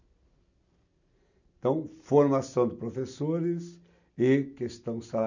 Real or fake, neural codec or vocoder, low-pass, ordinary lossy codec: real; none; 7.2 kHz; none